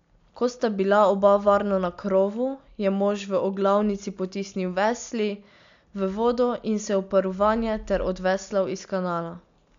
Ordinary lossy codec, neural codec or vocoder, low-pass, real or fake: MP3, 64 kbps; none; 7.2 kHz; real